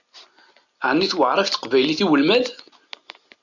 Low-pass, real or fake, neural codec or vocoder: 7.2 kHz; real; none